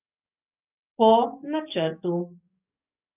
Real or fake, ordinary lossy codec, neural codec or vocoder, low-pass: fake; none; codec, 44.1 kHz, 7.8 kbps, DAC; 3.6 kHz